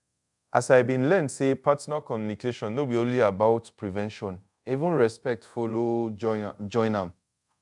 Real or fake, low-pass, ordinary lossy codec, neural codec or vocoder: fake; 10.8 kHz; none; codec, 24 kHz, 0.5 kbps, DualCodec